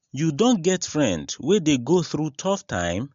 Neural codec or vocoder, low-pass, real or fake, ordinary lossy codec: none; 7.2 kHz; real; MP3, 48 kbps